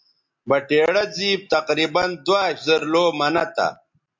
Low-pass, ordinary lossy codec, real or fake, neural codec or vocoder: 7.2 kHz; MP3, 64 kbps; real; none